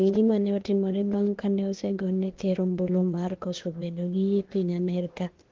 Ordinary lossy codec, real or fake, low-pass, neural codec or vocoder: Opus, 16 kbps; fake; 7.2 kHz; codec, 16 kHz, 0.8 kbps, ZipCodec